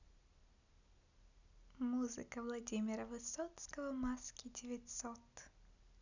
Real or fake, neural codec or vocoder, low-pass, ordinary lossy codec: real; none; 7.2 kHz; none